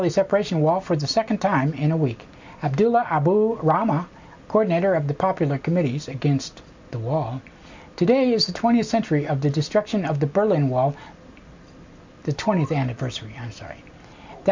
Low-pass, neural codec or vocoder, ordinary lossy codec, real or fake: 7.2 kHz; none; MP3, 64 kbps; real